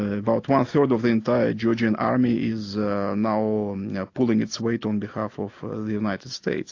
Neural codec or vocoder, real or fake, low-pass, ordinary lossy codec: none; real; 7.2 kHz; AAC, 48 kbps